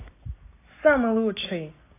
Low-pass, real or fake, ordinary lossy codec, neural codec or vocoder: 3.6 kHz; real; AAC, 24 kbps; none